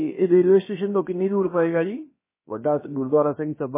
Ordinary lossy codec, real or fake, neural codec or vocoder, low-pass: MP3, 16 kbps; fake; codec, 16 kHz, about 1 kbps, DyCAST, with the encoder's durations; 3.6 kHz